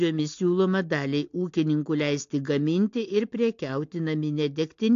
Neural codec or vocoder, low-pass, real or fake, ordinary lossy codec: none; 7.2 kHz; real; AAC, 48 kbps